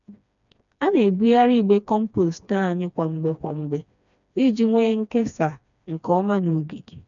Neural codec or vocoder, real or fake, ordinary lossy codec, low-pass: codec, 16 kHz, 2 kbps, FreqCodec, smaller model; fake; none; 7.2 kHz